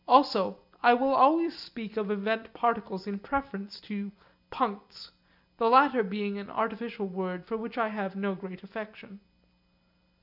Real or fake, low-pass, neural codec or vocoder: real; 5.4 kHz; none